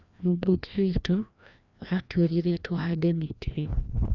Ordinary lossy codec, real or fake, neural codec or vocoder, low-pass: none; fake; codec, 16 kHz, 1 kbps, FreqCodec, larger model; 7.2 kHz